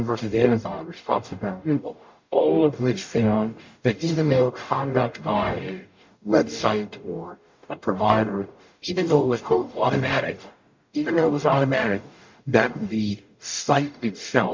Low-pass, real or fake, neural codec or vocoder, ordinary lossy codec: 7.2 kHz; fake; codec, 44.1 kHz, 0.9 kbps, DAC; MP3, 48 kbps